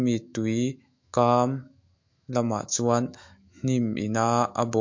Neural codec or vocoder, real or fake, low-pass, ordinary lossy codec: autoencoder, 48 kHz, 128 numbers a frame, DAC-VAE, trained on Japanese speech; fake; 7.2 kHz; MP3, 48 kbps